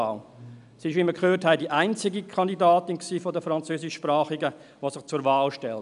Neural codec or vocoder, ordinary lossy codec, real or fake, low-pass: none; none; real; 10.8 kHz